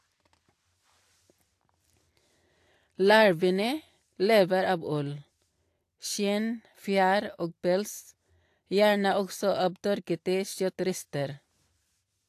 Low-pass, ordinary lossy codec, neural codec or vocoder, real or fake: 14.4 kHz; AAC, 64 kbps; none; real